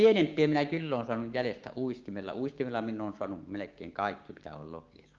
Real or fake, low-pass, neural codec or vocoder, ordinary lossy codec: fake; 7.2 kHz; codec, 16 kHz, 6 kbps, DAC; Opus, 32 kbps